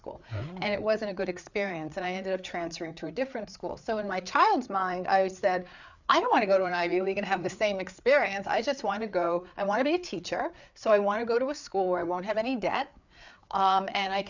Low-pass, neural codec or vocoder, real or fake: 7.2 kHz; codec, 16 kHz, 4 kbps, FreqCodec, larger model; fake